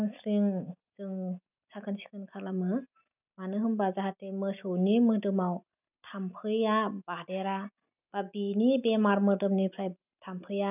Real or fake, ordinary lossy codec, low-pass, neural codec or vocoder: real; none; 3.6 kHz; none